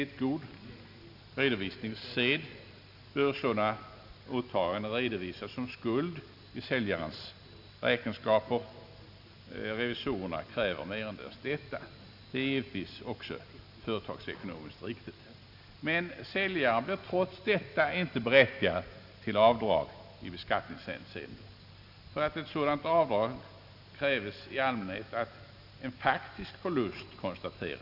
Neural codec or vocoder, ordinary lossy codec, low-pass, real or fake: none; none; 5.4 kHz; real